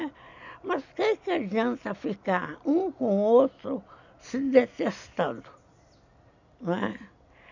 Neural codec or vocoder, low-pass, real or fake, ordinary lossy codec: none; 7.2 kHz; real; none